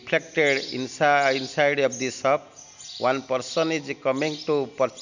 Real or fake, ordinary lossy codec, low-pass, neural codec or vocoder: real; none; 7.2 kHz; none